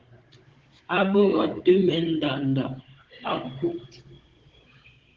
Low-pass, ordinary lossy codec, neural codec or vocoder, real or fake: 7.2 kHz; Opus, 16 kbps; codec, 16 kHz, 16 kbps, FunCodec, trained on Chinese and English, 50 frames a second; fake